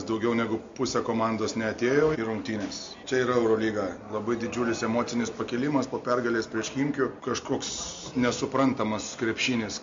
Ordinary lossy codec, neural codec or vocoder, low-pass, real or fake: MP3, 48 kbps; none; 7.2 kHz; real